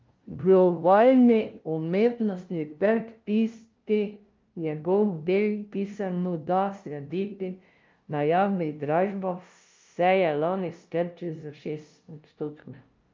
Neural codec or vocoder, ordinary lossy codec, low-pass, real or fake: codec, 16 kHz, 0.5 kbps, FunCodec, trained on LibriTTS, 25 frames a second; Opus, 32 kbps; 7.2 kHz; fake